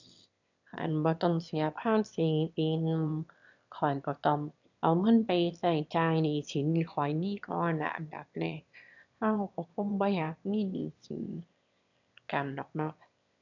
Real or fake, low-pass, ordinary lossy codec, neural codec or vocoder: fake; 7.2 kHz; none; autoencoder, 22.05 kHz, a latent of 192 numbers a frame, VITS, trained on one speaker